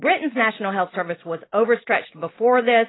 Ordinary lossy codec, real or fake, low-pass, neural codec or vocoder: AAC, 16 kbps; fake; 7.2 kHz; vocoder, 44.1 kHz, 128 mel bands every 256 samples, BigVGAN v2